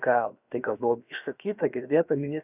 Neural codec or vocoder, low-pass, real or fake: codec, 16 kHz, about 1 kbps, DyCAST, with the encoder's durations; 3.6 kHz; fake